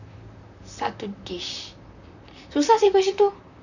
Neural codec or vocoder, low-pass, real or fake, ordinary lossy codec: vocoder, 44.1 kHz, 128 mel bands, Pupu-Vocoder; 7.2 kHz; fake; none